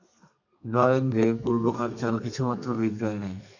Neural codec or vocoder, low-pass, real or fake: codec, 32 kHz, 1.9 kbps, SNAC; 7.2 kHz; fake